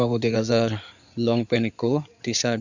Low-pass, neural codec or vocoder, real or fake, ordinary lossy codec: 7.2 kHz; codec, 16 kHz in and 24 kHz out, 2.2 kbps, FireRedTTS-2 codec; fake; none